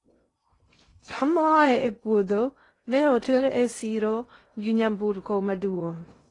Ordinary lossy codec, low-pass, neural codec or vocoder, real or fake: AAC, 32 kbps; 10.8 kHz; codec, 16 kHz in and 24 kHz out, 0.6 kbps, FocalCodec, streaming, 2048 codes; fake